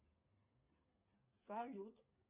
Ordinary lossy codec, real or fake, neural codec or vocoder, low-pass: Opus, 64 kbps; fake; codec, 16 kHz, 8 kbps, FreqCodec, smaller model; 3.6 kHz